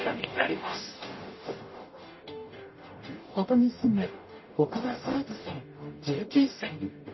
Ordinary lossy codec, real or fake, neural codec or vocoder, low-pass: MP3, 24 kbps; fake; codec, 44.1 kHz, 0.9 kbps, DAC; 7.2 kHz